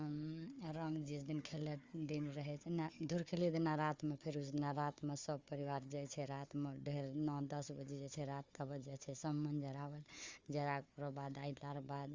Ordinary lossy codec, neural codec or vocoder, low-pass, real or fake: Opus, 32 kbps; codec, 16 kHz, 4 kbps, FunCodec, trained on Chinese and English, 50 frames a second; 7.2 kHz; fake